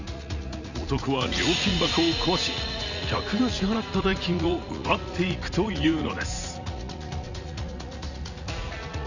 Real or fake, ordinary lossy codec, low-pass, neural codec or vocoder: fake; none; 7.2 kHz; vocoder, 44.1 kHz, 80 mel bands, Vocos